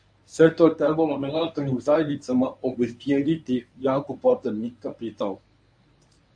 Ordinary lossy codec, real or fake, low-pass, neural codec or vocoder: AAC, 64 kbps; fake; 9.9 kHz; codec, 24 kHz, 0.9 kbps, WavTokenizer, medium speech release version 1